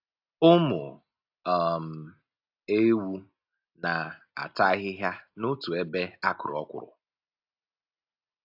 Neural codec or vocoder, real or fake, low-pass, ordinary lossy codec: none; real; 5.4 kHz; AAC, 48 kbps